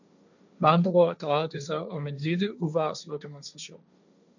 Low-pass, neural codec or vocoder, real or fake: 7.2 kHz; codec, 16 kHz, 1.1 kbps, Voila-Tokenizer; fake